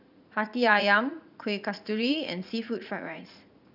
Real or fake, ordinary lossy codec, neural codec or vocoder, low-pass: fake; none; vocoder, 44.1 kHz, 80 mel bands, Vocos; 5.4 kHz